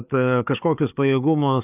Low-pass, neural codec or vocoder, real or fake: 3.6 kHz; codec, 16 kHz, 4 kbps, FreqCodec, larger model; fake